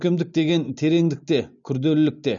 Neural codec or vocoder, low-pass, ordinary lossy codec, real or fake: none; 7.2 kHz; none; real